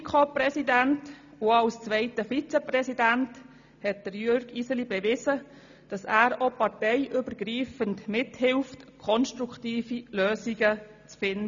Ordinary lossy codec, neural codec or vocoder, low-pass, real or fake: none; none; 7.2 kHz; real